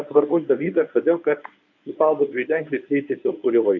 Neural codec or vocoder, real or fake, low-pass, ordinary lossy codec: codec, 24 kHz, 0.9 kbps, WavTokenizer, medium speech release version 1; fake; 7.2 kHz; MP3, 48 kbps